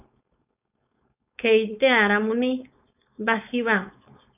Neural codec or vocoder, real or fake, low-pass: codec, 16 kHz, 4.8 kbps, FACodec; fake; 3.6 kHz